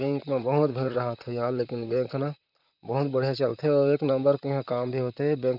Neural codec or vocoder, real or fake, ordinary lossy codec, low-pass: vocoder, 44.1 kHz, 128 mel bands, Pupu-Vocoder; fake; none; 5.4 kHz